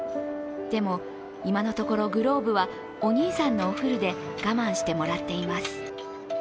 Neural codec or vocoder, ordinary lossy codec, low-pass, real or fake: none; none; none; real